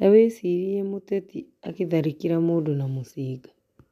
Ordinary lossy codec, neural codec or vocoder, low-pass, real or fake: none; none; 14.4 kHz; real